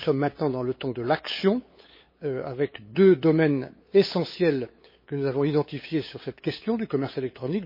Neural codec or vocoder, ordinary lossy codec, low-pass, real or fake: codec, 16 kHz, 8 kbps, FunCodec, trained on LibriTTS, 25 frames a second; MP3, 24 kbps; 5.4 kHz; fake